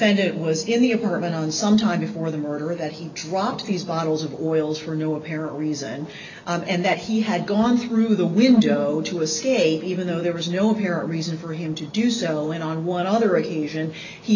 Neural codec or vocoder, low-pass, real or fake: none; 7.2 kHz; real